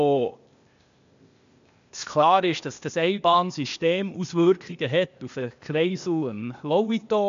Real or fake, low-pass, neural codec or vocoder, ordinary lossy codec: fake; 7.2 kHz; codec, 16 kHz, 0.8 kbps, ZipCodec; MP3, 64 kbps